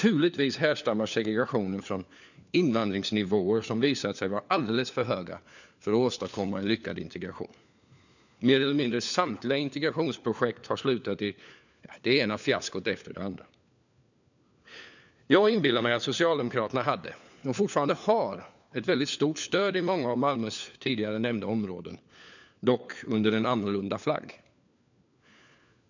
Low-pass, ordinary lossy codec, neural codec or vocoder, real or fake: 7.2 kHz; none; codec, 16 kHz, 4 kbps, FunCodec, trained on LibriTTS, 50 frames a second; fake